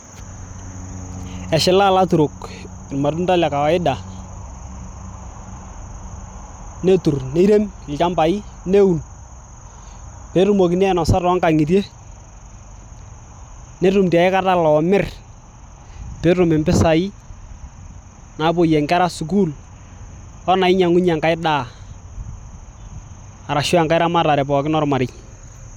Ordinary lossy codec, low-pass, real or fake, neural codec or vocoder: none; 19.8 kHz; real; none